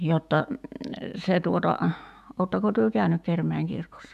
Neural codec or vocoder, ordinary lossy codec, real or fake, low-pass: autoencoder, 48 kHz, 128 numbers a frame, DAC-VAE, trained on Japanese speech; none; fake; 14.4 kHz